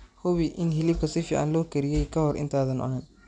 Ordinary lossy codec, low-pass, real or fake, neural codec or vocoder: none; 9.9 kHz; real; none